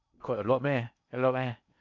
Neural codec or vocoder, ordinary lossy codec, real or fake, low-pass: codec, 16 kHz in and 24 kHz out, 0.8 kbps, FocalCodec, streaming, 65536 codes; none; fake; 7.2 kHz